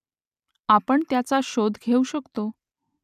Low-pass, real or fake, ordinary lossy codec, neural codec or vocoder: 14.4 kHz; real; none; none